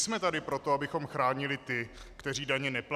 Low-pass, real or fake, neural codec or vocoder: 14.4 kHz; real; none